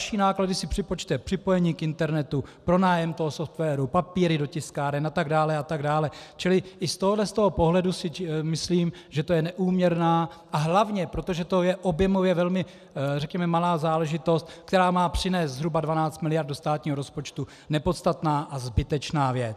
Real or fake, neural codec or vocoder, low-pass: real; none; 14.4 kHz